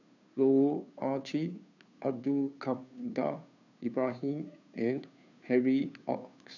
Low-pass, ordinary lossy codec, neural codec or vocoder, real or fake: 7.2 kHz; none; codec, 16 kHz, 2 kbps, FunCodec, trained on Chinese and English, 25 frames a second; fake